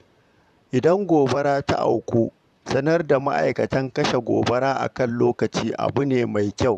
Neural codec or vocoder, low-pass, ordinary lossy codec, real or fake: vocoder, 44.1 kHz, 128 mel bands, Pupu-Vocoder; 14.4 kHz; none; fake